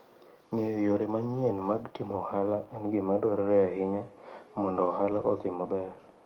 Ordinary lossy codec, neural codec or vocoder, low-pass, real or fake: Opus, 24 kbps; codec, 44.1 kHz, 7.8 kbps, DAC; 19.8 kHz; fake